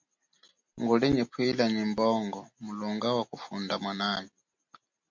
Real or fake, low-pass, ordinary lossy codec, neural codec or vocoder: real; 7.2 kHz; MP3, 48 kbps; none